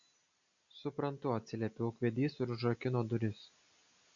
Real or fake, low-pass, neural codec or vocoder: real; 7.2 kHz; none